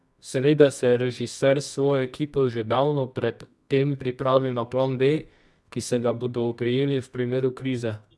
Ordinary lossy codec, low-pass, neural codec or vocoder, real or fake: none; none; codec, 24 kHz, 0.9 kbps, WavTokenizer, medium music audio release; fake